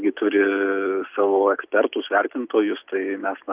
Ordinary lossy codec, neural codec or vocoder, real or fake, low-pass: Opus, 24 kbps; none; real; 3.6 kHz